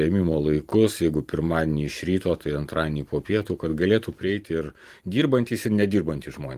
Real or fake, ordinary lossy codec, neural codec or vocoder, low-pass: fake; Opus, 24 kbps; vocoder, 44.1 kHz, 128 mel bands every 512 samples, BigVGAN v2; 14.4 kHz